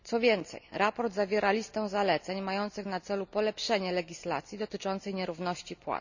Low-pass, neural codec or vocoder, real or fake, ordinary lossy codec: 7.2 kHz; none; real; none